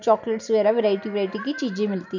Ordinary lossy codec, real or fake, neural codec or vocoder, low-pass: none; real; none; 7.2 kHz